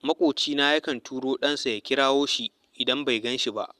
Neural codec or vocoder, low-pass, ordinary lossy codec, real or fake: none; 14.4 kHz; Opus, 64 kbps; real